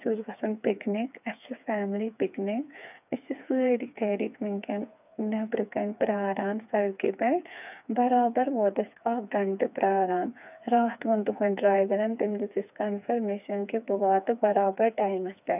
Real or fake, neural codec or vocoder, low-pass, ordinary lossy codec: fake; codec, 16 kHz, 4 kbps, FreqCodec, smaller model; 3.6 kHz; none